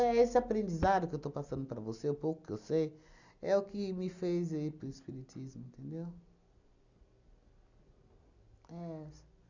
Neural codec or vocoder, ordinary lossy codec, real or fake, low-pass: none; none; real; 7.2 kHz